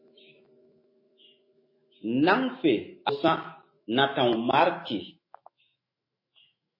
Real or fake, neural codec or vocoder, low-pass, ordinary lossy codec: fake; autoencoder, 48 kHz, 128 numbers a frame, DAC-VAE, trained on Japanese speech; 5.4 kHz; MP3, 24 kbps